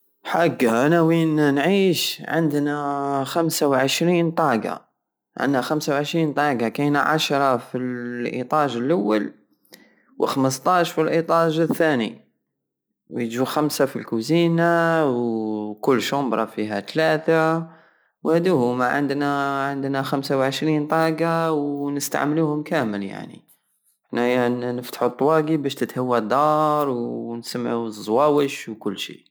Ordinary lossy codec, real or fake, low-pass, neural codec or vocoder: none; real; none; none